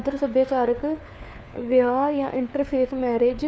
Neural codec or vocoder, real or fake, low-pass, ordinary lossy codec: codec, 16 kHz, 4 kbps, FunCodec, trained on LibriTTS, 50 frames a second; fake; none; none